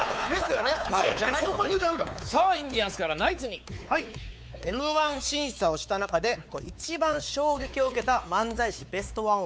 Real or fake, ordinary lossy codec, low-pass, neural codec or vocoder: fake; none; none; codec, 16 kHz, 4 kbps, X-Codec, WavLM features, trained on Multilingual LibriSpeech